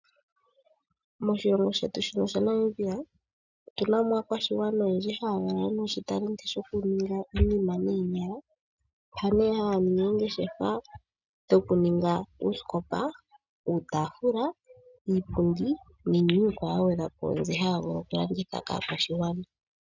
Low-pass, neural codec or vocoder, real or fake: 7.2 kHz; none; real